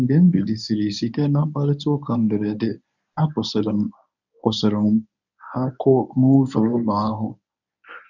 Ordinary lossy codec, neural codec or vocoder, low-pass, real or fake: none; codec, 24 kHz, 0.9 kbps, WavTokenizer, medium speech release version 1; 7.2 kHz; fake